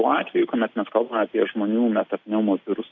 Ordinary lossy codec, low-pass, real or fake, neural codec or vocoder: Opus, 64 kbps; 7.2 kHz; real; none